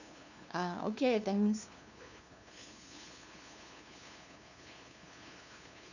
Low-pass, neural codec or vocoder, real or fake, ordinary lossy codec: 7.2 kHz; codec, 16 kHz, 2 kbps, FunCodec, trained on LibriTTS, 25 frames a second; fake; none